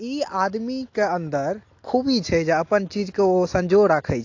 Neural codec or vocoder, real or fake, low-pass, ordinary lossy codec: none; real; 7.2 kHz; AAC, 48 kbps